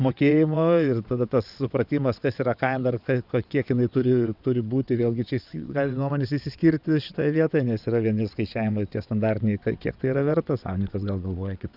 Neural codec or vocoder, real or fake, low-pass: vocoder, 22.05 kHz, 80 mel bands, WaveNeXt; fake; 5.4 kHz